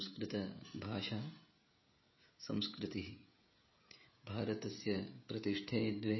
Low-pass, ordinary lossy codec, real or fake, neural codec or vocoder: 7.2 kHz; MP3, 24 kbps; fake; codec, 16 kHz, 16 kbps, FreqCodec, smaller model